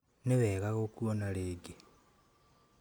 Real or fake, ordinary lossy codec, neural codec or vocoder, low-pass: real; none; none; none